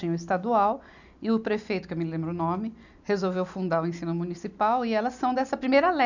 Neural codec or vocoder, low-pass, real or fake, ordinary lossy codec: none; 7.2 kHz; real; none